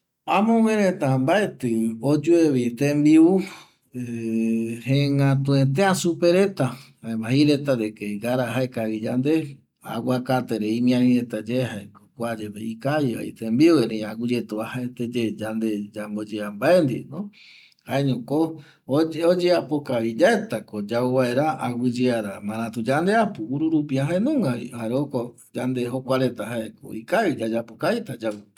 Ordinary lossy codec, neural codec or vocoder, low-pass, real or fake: none; none; 19.8 kHz; real